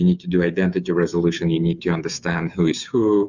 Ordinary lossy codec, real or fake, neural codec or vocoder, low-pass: Opus, 64 kbps; fake; codec, 16 kHz, 8 kbps, FreqCodec, smaller model; 7.2 kHz